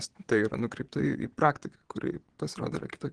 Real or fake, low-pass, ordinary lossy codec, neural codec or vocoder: fake; 9.9 kHz; Opus, 16 kbps; vocoder, 22.05 kHz, 80 mel bands, Vocos